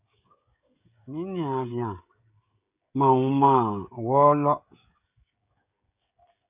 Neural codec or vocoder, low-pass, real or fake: codec, 16 kHz, 16 kbps, FreqCodec, smaller model; 3.6 kHz; fake